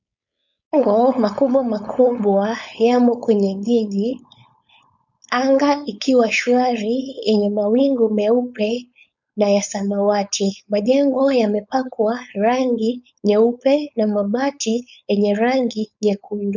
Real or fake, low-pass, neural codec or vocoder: fake; 7.2 kHz; codec, 16 kHz, 4.8 kbps, FACodec